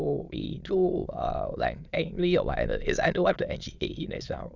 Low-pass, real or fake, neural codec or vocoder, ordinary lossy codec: 7.2 kHz; fake; autoencoder, 22.05 kHz, a latent of 192 numbers a frame, VITS, trained on many speakers; none